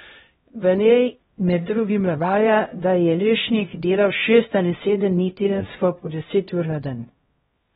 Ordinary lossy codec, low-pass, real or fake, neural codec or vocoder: AAC, 16 kbps; 7.2 kHz; fake; codec, 16 kHz, 0.5 kbps, X-Codec, HuBERT features, trained on LibriSpeech